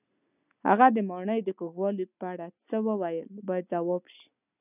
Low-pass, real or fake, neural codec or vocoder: 3.6 kHz; real; none